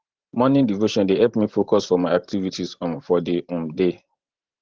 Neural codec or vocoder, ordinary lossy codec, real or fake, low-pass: vocoder, 44.1 kHz, 128 mel bands every 512 samples, BigVGAN v2; Opus, 16 kbps; fake; 7.2 kHz